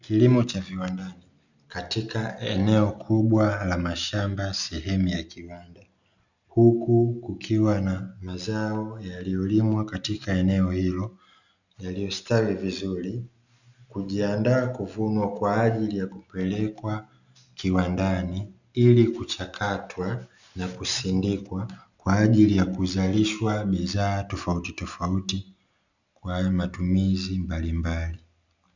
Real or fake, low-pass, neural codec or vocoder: real; 7.2 kHz; none